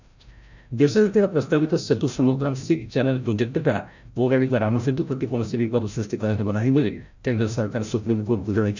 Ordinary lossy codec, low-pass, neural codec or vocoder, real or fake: none; 7.2 kHz; codec, 16 kHz, 0.5 kbps, FreqCodec, larger model; fake